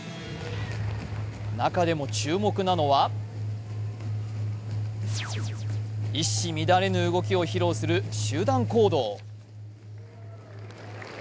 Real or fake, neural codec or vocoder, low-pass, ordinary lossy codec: real; none; none; none